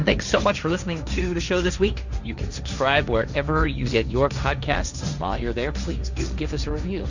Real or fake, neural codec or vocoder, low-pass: fake; codec, 16 kHz, 1.1 kbps, Voila-Tokenizer; 7.2 kHz